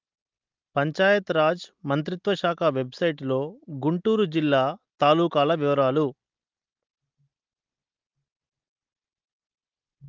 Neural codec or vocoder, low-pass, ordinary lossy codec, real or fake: none; 7.2 kHz; Opus, 32 kbps; real